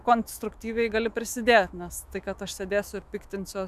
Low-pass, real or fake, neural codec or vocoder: 14.4 kHz; fake; autoencoder, 48 kHz, 128 numbers a frame, DAC-VAE, trained on Japanese speech